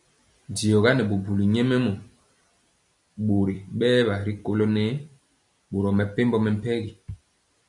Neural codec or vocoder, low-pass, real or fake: vocoder, 44.1 kHz, 128 mel bands every 256 samples, BigVGAN v2; 10.8 kHz; fake